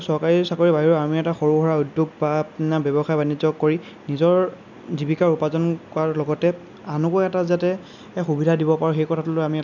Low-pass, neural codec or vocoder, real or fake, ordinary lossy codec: 7.2 kHz; none; real; none